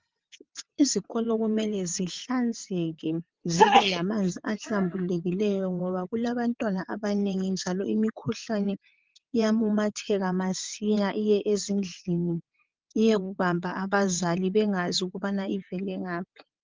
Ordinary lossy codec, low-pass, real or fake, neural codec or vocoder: Opus, 32 kbps; 7.2 kHz; fake; vocoder, 22.05 kHz, 80 mel bands, WaveNeXt